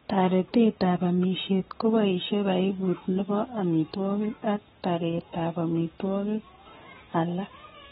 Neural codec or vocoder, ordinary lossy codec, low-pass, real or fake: codec, 44.1 kHz, 7.8 kbps, Pupu-Codec; AAC, 16 kbps; 19.8 kHz; fake